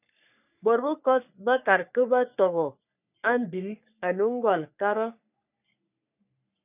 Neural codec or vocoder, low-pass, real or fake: codec, 44.1 kHz, 3.4 kbps, Pupu-Codec; 3.6 kHz; fake